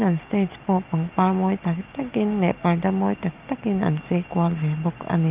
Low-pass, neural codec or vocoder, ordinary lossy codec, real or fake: 3.6 kHz; none; Opus, 32 kbps; real